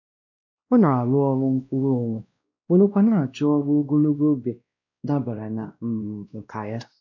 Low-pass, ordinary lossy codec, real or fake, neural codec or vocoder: 7.2 kHz; none; fake; codec, 16 kHz, 1 kbps, X-Codec, WavLM features, trained on Multilingual LibriSpeech